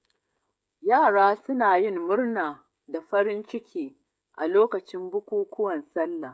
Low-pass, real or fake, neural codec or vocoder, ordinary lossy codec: none; fake; codec, 16 kHz, 16 kbps, FreqCodec, smaller model; none